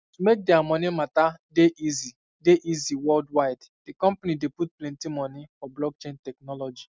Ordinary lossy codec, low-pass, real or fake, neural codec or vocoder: none; none; real; none